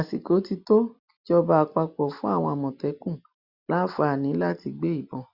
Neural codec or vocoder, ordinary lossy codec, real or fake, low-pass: none; Opus, 64 kbps; real; 5.4 kHz